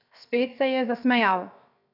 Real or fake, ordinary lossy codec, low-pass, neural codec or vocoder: fake; none; 5.4 kHz; codec, 16 kHz, 0.7 kbps, FocalCodec